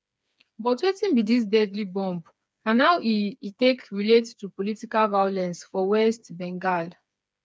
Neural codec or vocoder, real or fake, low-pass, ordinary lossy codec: codec, 16 kHz, 4 kbps, FreqCodec, smaller model; fake; none; none